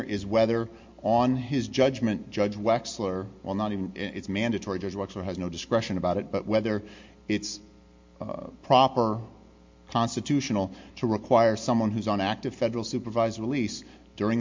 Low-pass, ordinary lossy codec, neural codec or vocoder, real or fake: 7.2 kHz; MP3, 48 kbps; none; real